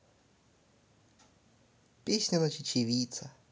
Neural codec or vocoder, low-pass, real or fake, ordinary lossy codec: none; none; real; none